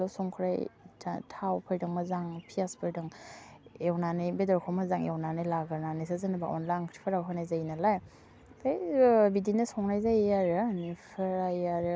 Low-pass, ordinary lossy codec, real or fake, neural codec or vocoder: none; none; real; none